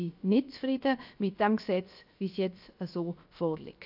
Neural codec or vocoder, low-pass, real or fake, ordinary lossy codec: codec, 16 kHz, 0.7 kbps, FocalCodec; 5.4 kHz; fake; AAC, 48 kbps